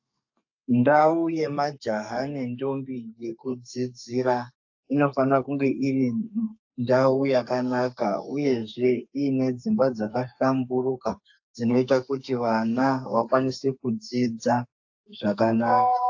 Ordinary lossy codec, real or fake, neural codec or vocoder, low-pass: AAC, 48 kbps; fake; codec, 32 kHz, 1.9 kbps, SNAC; 7.2 kHz